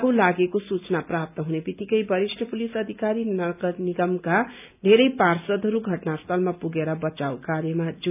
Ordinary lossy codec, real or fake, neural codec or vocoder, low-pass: none; real; none; 3.6 kHz